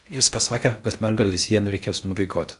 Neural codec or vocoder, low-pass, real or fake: codec, 16 kHz in and 24 kHz out, 0.6 kbps, FocalCodec, streaming, 4096 codes; 10.8 kHz; fake